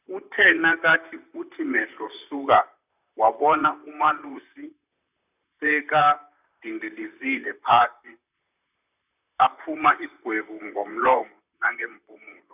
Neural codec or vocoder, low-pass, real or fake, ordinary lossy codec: vocoder, 44.1 kHz, 128 mel bands, Pupu-Vocoder; 3.6 kHz; fake; none